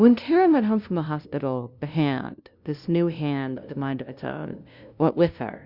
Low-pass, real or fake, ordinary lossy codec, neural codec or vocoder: 5.4 kHz; fake; Opus, 64 kbps; codec, 16 kHz, 0.5 kbps, FunCodec, trained on LibriTTS, 25 frames a second